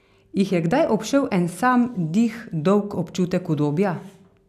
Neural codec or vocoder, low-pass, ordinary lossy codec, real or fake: none; 14.4 kHz; none; real